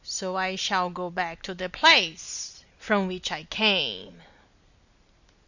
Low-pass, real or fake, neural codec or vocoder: 7.2 kHz; real; none